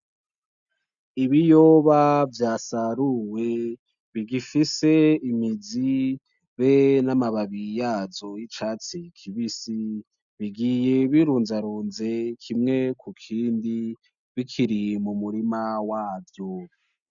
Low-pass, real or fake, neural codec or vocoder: 7.2 kHz; real; none